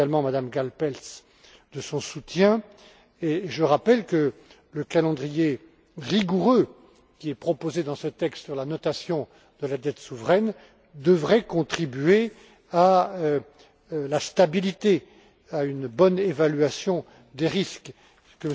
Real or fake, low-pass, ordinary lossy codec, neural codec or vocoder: real; none; none; none